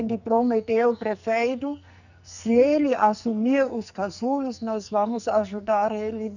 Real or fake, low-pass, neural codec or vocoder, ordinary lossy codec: fake; 7.2 kHz; codec, 44.1 kHz, 2.6 kbps, SNAC; none